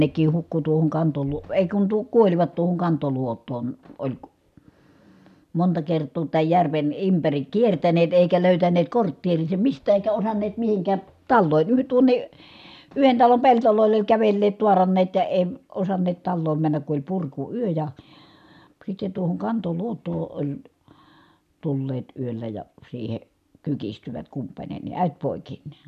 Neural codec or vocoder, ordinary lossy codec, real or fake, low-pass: none; none; real; 14.4 kHz